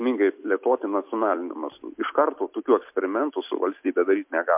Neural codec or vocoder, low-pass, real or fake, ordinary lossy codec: none; 3.6 kHz; real; MP3, 32 kbps